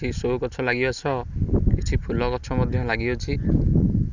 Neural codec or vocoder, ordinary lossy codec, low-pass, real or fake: none; Opus, 64 kbps; 7.2 kHz; real